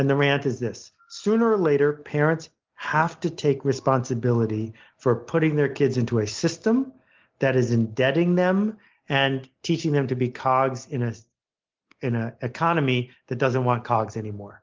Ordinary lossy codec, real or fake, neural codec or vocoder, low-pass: Opus, 32 kbps; real; none; 7.2 kHz